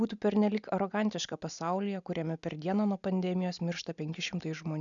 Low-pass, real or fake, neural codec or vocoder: 7.2 kHz; real; none